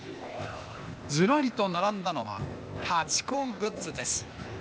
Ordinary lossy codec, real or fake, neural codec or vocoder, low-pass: none; fake; codec, 16 kHz, 0.8 kbps, ZipCodec; none